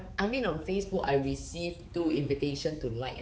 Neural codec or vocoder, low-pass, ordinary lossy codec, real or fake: codec, 16 kHz, 4 kbps, X-Codec, HuBERT features, trained on balanced general audio; none; none; fake